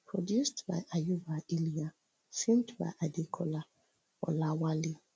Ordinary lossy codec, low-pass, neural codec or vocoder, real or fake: none; none; none; real